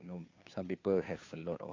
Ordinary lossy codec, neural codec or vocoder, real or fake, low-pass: AAC, 32 kbps; codec, 16 kHz, 2 kbps, FunCodec, trained on Chinese and English, 25 frames a second; fake; 7.2 kHz